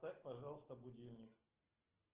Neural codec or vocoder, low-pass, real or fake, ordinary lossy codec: none; 3.6 kHz; real; Opus, 16 kbps